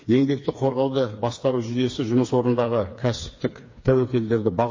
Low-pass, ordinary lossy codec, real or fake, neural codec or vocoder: 7.2 kHz; MP3, 32 kbps; fake; codec, 16 kHz, 4 kbps, FreqCodec, smaller model